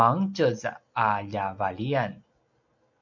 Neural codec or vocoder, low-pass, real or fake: none; 7.2 kHz; real